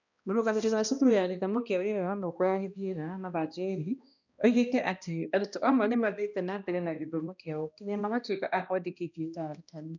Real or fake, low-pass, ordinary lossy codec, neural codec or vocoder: fake; 7.2 kHz; none; codec, 16 kHz, 1 kbps, X-Codec, HuBERT features, trained on balanced general audio